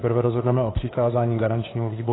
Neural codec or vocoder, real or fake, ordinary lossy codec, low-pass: codec, 16 kHz, 2 kbps, FunCodec, trained on Chinese and English, 25 frames a second; fake; AAC, 16 kbps; 7.2 kHz